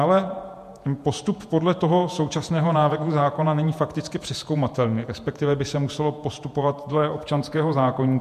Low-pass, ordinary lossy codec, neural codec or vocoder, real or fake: 14.4 kHz; MP3, 64 kbps; vocoder, 48 kHz, 128 mel bands, Vocos; fake